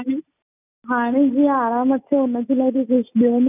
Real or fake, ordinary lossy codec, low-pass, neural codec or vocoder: real; none; 3.6 kHz; none